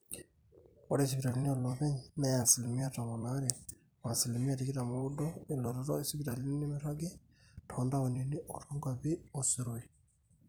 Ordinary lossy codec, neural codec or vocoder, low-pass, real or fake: none; vocoder, 44.1 kHz, 128 mel bands every 256 samples, BigVGAN v2; none; fake